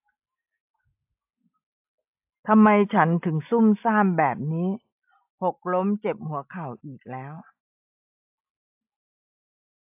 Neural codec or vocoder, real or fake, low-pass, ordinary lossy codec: none; real; 3.6 kHz; AAC, 32 kbps